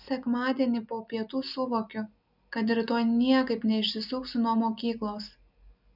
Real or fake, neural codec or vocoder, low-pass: real; none; 5.4 kHz